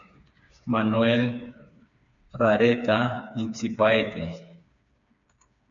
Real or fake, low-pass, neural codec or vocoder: fake; 7.2 kHz; codec, 16 kHz, 4 kbps, FreqCodec, smaller model